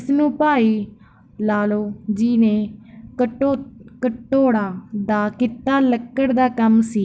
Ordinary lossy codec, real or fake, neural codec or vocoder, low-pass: none; real; none; none